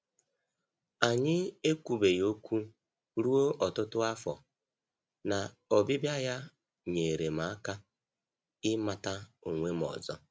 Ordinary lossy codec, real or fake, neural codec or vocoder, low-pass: none; real; none; none